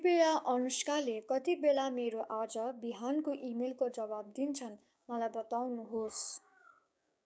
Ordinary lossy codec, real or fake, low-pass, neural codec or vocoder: none; fake; none; codec, 16 kHz, 6 kbps, DAC